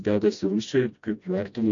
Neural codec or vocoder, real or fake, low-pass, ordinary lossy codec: codec, 16 kHz, 1 kbps, FreqCodec, smaller model; fake; 7.2 kHz; AAC, 64 kbps